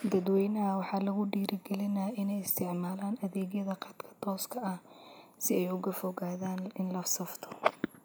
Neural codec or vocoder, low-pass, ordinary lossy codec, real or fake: none; none; none; real